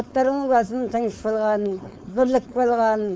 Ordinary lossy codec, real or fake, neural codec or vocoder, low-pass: none; fake; codec, 16 kHz, 4.8 kbps, FACodec; none